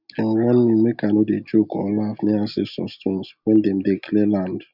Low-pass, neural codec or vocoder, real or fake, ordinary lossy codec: 5.4 kHz; none; real; none